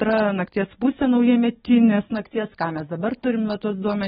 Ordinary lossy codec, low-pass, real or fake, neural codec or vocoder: AAC, 16 kbps; 14.4 kHz; real; none